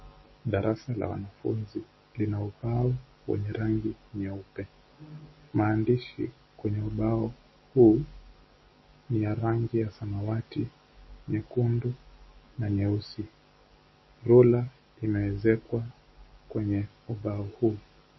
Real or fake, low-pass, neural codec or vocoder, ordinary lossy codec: real; 7.2 kHz; none; MP3, 24 kbps